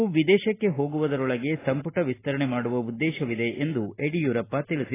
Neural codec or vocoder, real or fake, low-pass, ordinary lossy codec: none; real; 3.6 kHz; AAC, 16 kbps